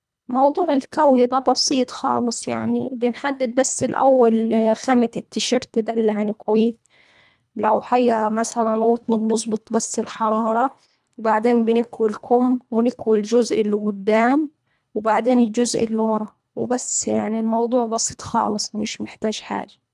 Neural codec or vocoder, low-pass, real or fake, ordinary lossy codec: codec, 24 kHz, 1.5 kbps, HILCodec; none; fake; none